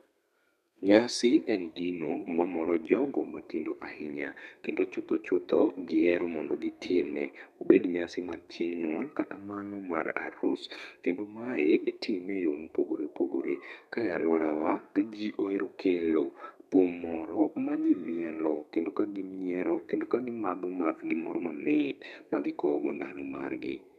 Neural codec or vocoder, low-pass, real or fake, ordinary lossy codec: codec, 32 kHz, 1.9 kbps, SNAC; 14.4 kHz; fake; none